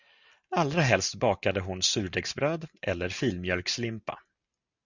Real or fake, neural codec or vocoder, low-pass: real; none; 7.2 kHz